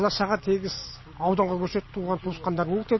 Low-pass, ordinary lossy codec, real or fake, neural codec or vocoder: 7.2 kHz; MP3, 24 kbps; real; none